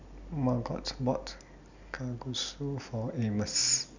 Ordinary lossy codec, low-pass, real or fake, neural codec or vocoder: none; 7.2 kHz; real; none